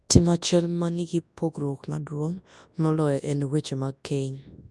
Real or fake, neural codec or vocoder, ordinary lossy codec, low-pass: fake; codec, 24 kHz, 0.9 kbps, WavTokenizer, large speech release; none; none